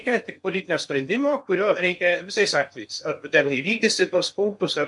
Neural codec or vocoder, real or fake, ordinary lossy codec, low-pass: codec, 16 kHz in and 24 kHz out, 0.8 kbps, FocalCodec, streaming, 65536 codes; fake; MP3, 64 kbps; 10.8 kHz